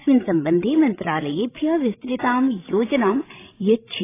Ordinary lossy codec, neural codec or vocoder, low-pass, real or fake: AAC, 16 kbps; codec, 16 kHz, 16 kbps, FreqCodec, larger model; 3.6 kHz; fake